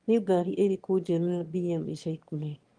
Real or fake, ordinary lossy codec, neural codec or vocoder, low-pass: fake; Opus, 24 kbps; autoencoder, 22.05 kHz, a latent of 192 numbers a frame, VITS, trained on one speaker; 9.9 kHz